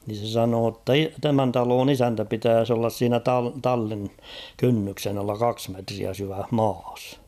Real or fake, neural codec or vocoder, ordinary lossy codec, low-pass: real; none; none; 14.4 kHz